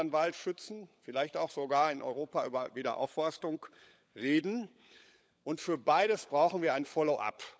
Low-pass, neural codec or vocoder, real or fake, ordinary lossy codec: none; codec, 16 kHz, 16 kbps, FunCodec, trained on LibriTTS, 50 frames a second; fake; none